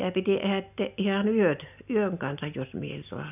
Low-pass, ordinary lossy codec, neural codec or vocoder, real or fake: 3.6 kHz; none; none; real